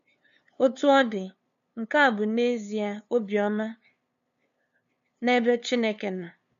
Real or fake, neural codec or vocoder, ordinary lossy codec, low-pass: fake; codec, 16 kHz, 2 kbps, FunCodec, trained on LibriTTS, 25 frames a second; none; 7.2 kHz